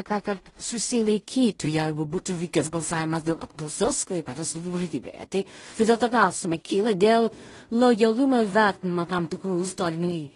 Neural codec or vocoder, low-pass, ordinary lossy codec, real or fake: codec, 16 kHz in and 24 kHz out, 0.4 kbps, LongCat-Audio-Codec, two codebook decoder; 10.8 kHz; AAC, 32 kbps; fake